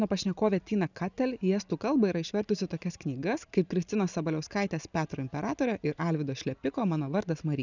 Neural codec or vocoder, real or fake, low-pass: none; real; 7.2 kHz